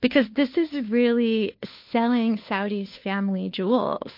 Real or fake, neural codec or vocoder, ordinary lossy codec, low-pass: fake; codec, 16 kHz, 2 kbps, FunCodec, trained on Chinese and English, 25 frames a second; MP3, 32 kbps; 5.4 kHz